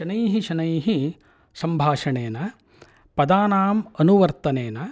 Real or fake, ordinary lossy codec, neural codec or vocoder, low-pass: real; none; none; none